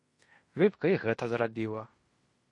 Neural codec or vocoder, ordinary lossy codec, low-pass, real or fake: codec, 16 kHz in and 24 kHz out, 0.9 kbps, LongCat-Audio-Codec, fine tuned four codebook decoder; MP3, 48 kbps; 10.8 kHz; fake